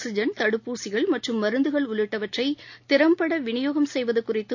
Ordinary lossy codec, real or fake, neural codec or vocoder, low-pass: AAC, 48 kbps; real; none; 7.2 kHz